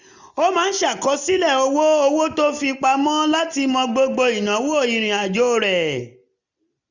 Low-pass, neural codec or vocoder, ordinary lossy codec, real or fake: 7.2 kHz; none; none; real